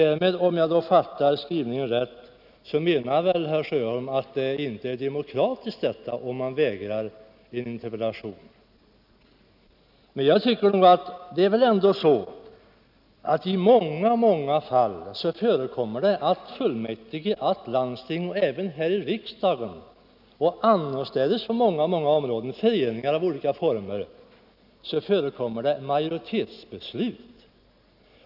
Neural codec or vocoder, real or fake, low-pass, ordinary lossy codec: none; real; 5.4 kHz; none